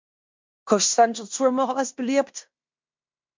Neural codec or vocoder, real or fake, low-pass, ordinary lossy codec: codec, 16 kHz in and 24 kHz out, 0.9 kbps, LongCat-Audio-Codec, fine tuned four codebook decoder; fake; 7.2 kHz; MP3, 64 kbps